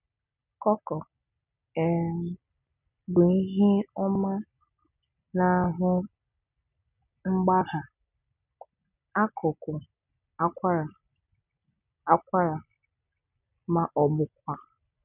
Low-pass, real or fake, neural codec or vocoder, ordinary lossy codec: 3.6 kHz; real; none; none